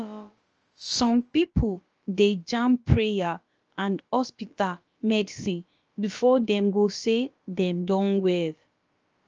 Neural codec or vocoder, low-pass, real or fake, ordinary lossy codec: codec, 16 kHz, about 1 kbps, DyCAST, with the encoder's durations; 7.2 kHz; fake; Opus, 24 kbps